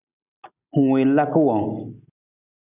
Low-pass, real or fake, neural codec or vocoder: 3.6 kHz; real; none